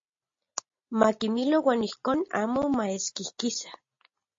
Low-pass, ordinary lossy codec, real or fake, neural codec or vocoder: 7.2 kHz; MP3, 32 kbps; real; none